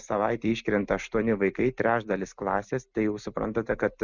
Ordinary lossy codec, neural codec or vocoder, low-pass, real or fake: Opus, 64 kbps; none; 7.2 kHz; real